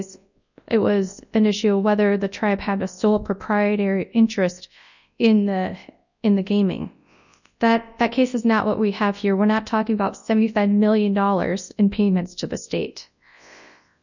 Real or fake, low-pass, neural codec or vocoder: fake; 7.2 kHz; codec, 24 kHz, 0.9 kbps, WavTokenizer, large speech release